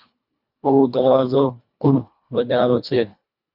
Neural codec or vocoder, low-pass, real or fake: codec, 24 kHz, 1.5 kbps, HILCodec; 5.4 kHz; fake